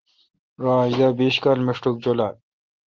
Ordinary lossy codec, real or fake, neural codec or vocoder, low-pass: Opus, 16 kbps; real; none; 7.2 kHz